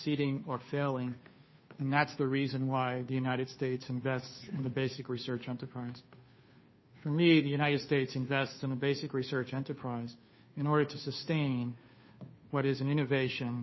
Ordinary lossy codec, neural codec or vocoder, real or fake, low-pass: MP3, 24 kbps; codec, 16 kHz, 2 kbps, FunCodec, trained on Chinese and English, 25 frames a second; fake; 7.2 kHz